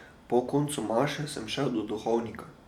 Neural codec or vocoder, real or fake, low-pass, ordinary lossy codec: vocoder, 44.1 kHz, 128 mel bands every 512 samples, BigVGAN v2; fake; 19.8 kHz; none